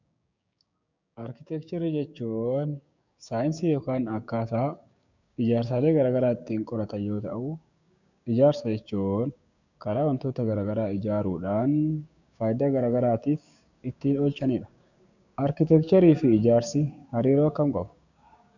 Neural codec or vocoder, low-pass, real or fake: codec, 16 kHz, 6 kbps, DAC; 7.2 kHz; fake